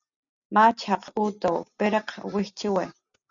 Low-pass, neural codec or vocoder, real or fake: 7.2 kHz; none; real